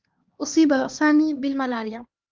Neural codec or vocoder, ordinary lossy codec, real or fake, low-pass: codec, 16 kHz, 2 kbps, X-Codec, HuBERT features, trained on LibriSpeech; Opus, 32 kbps; fake; 7.2 kHz